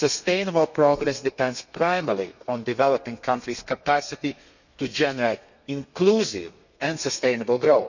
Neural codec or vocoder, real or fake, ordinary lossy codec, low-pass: codec, 32 kHz, 1.9 kbps, SNAC; fake; none; 7.2 kHz